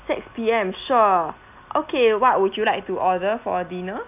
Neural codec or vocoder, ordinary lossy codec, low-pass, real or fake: none; none; 3.6 kHz; real